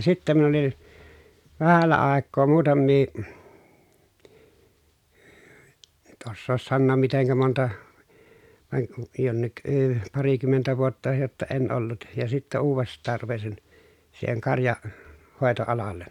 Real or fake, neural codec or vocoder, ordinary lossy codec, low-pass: real; none; none; 19.8 kHz